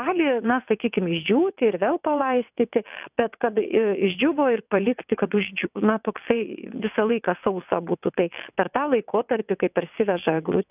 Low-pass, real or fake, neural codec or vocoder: 3.6 kHz; fake; vocoder, 22.05 kHz, 80 mel bands, WaveNeXt